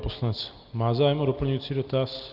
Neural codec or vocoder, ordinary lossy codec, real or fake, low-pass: none; Opus, 24 kbps; real; 5.4 kHz